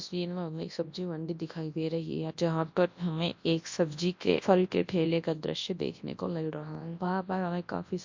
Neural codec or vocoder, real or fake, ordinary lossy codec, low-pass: codec, 24 kHz, 0.9 kbps, WavTokenizer, large speech release; fake; MP3, 48 kbps; 7.2 kHz